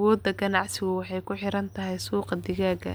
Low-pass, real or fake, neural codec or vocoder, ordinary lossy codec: none; real; none; none